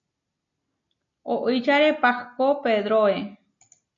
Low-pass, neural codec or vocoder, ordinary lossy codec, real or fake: 7.2 kHz; none; MP3, 48 kbps; real